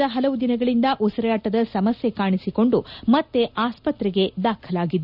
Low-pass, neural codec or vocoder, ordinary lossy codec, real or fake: 5.4 kHz; none; none; real